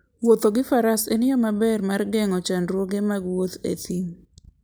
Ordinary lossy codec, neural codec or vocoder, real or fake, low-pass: none; none; real; none